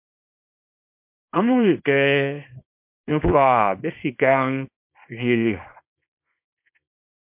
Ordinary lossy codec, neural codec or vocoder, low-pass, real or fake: MP3, 32 kbps; codec, 24 kHz, 0.9 kbps, WavTokenizer, small release; 3.6 kHz; fake